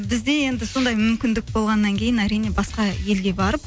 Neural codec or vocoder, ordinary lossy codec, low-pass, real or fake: none; none; none; real